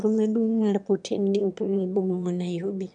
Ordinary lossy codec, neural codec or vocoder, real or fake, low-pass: none; autoencoder, 22.05 kHz, a latent of 192 numbers a frame, VITS, trained on one speaker; fake; 9.9 kHz